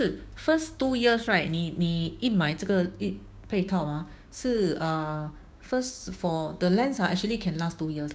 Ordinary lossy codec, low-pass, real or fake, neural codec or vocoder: none; none; fake; codec, 16 kHz, 6 kbps, DAC